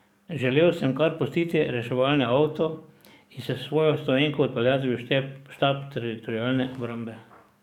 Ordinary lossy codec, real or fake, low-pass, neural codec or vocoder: none; fake; 19.8 kHz; codec, 44.1 kHz, 7.8 kbps, DAC